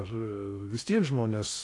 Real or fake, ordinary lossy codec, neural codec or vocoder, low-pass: fake; AAC, 48 kbps; codec, 16 kHz in and 24 kHz out, 0.6 kbps, FocalCodec, streaming, 2048 codes; 10.8 kHz